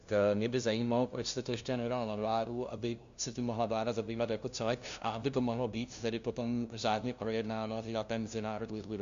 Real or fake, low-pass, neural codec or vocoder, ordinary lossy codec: fake; 7.2 kHz; codec, 16 kHz, 0.5 kbps, FunCodec, trained on LibriTTS, 25 frames a second; Opus, 64 kbps